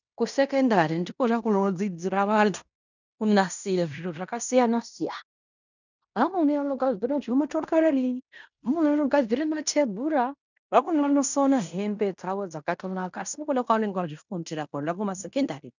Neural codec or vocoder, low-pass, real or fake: codec, 16 kHz in and 24 kHz out, 0.9 kbps, LongCat-Audio-Codec, fine tuned four codebook decoder; 7.2 kHz; fake